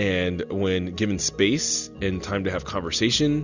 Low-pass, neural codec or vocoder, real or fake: 7.2 kHz; none; real